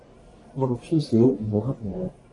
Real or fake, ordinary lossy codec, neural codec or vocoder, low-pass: fake; AAC, 32 kbps; codec, 44.1 kHz, 1.7 kbps, Pupu-Codec; 10.8 kHz